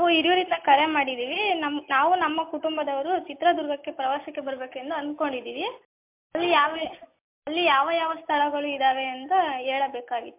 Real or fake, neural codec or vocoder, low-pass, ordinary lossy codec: real; none; 3.6 kHz; MP3, 32 kbps